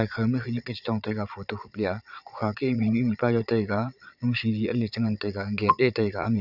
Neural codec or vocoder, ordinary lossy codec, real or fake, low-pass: vocoder, 22.05 kHz, 80 mel bands, Vocos; none; fake; 5.4 kHz